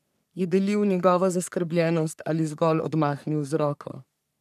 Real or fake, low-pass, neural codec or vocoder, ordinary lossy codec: fake; 14.4 kHz; codec, 44.1 kHz, 3.4 kbps, Pupu-Codec; none